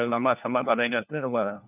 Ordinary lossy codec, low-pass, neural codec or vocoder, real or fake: none; 3.6 kHz; codec, 16 kHz, 1 kbps, FunCodec, trained on LibriTTS, 50 frames a second; fake